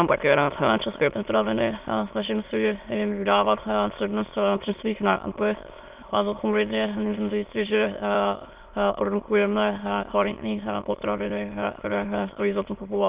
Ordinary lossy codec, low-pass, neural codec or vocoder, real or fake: Opus, 32 kbps; 3.6 kHz; autoencoder, 22.05 kHz, a latent of 192 numbers a frame, VITS, trained on many speakers; fake